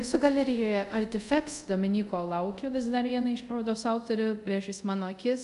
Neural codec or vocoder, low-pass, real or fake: codec, 24 kHz, 0.5 kbps, DualCodec; 10.8 kHz; fake